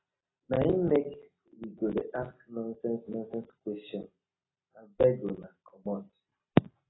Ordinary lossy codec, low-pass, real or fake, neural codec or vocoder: AAC, 16 kbps; 7.2 kHz; real; none